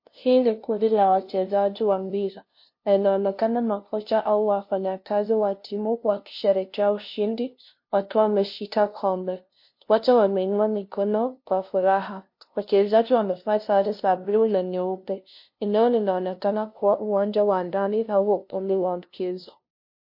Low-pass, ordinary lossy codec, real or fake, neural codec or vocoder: 5.4 kHz; MP3, 32 kbps; fake; codec, 16 kHz, 0.5 kbps, FunCodec, trained on LibriTTS, 25 frames a second